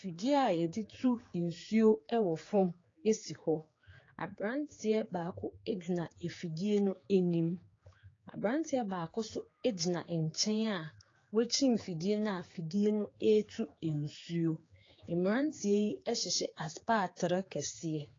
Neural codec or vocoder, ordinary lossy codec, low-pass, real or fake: codec, 16 kHz, 4 kbps, X-Codec, HuBERT features, trained on general audio; AAC, 32 kbps; 7.2 kHz; fake